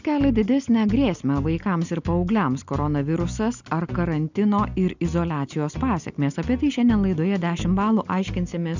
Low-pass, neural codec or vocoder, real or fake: 7.2 kHz; none; real